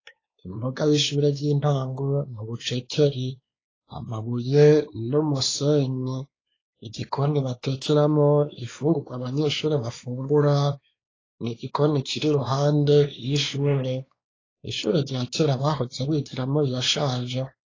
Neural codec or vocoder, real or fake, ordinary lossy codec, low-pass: codec, 16 kHz, 2 kbps, X-Codec, WavLM features, trained on Multilingual LibriSpeech; fake; AAC, 32 kbps; 7.2 kHz